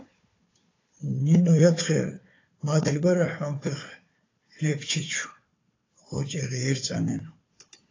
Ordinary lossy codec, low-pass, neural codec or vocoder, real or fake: AAC, 32 kbps; 7.2 kHz; codec, 16 kHz, 4 kbps, FunCodec, trained on Chinese and English, 50 frames a second; fake